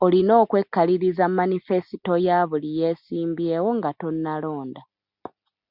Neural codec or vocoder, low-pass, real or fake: none; 5.4 kHz; real